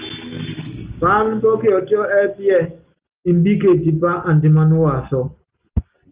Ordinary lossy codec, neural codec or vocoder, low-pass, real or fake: Opus, 24 kbps; none; 3.6 kHz; real